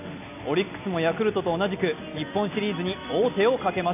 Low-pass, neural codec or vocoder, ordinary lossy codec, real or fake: 3.6 kHz; none; none; real